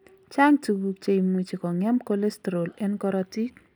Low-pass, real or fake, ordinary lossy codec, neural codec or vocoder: none; real; none; none